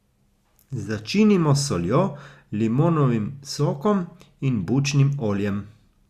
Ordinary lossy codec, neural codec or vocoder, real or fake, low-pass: Opus, 64 kbps; none; real; 14.4 kHz